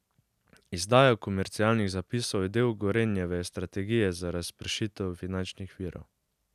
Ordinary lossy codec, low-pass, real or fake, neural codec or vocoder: none; 14.4 kHz; real; none